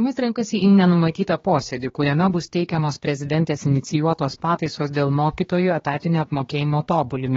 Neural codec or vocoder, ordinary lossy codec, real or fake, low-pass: codec, 16 kHz, 2 kbps, X-Codec, HuBERT features, trained on general audio; AAC, 24 kbps; fake; 7.2 kHz